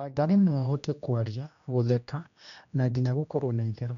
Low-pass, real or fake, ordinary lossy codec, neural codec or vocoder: 7.2 kHz; fake; none; codec, 16 kHz, 1 kbps, X-Codec, HuBERT features, trained on general audio